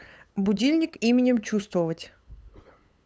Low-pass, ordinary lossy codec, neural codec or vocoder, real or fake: none; none; codec, 16 kHz, 8 kbps, FunCodec, trained on LibriTTS, 25 frames a second; fake